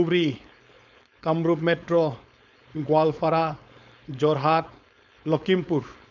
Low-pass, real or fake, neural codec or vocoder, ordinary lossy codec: 7.2 kHz; fake; codec, 16 kHz, 4.8 kbps, FACodec; none